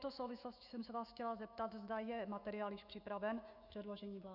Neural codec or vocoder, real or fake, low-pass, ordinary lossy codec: autoencoder, 48 kHz, 128 numbers a frame, DAC-VAE, trained on Japanese speech; fake; 5.4 kHz; Opus, 64 kbps